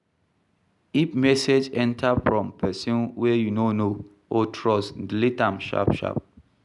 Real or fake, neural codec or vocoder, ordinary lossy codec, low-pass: real; none; none; 10.8 kHz